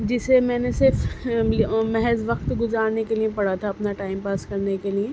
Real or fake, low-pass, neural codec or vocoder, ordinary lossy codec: real; none; none; none